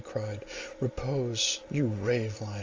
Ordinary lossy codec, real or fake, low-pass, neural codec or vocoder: Opus, 32 kbps; real; 7.2 kHz; none